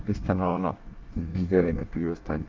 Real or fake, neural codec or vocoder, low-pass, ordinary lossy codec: fake; codec, 24 kHz, 1 kbps, SNAC; 7.2 kHz; Opus, 32 kbps